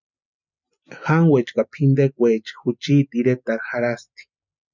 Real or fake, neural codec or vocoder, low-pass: real; none; 7.2 kHz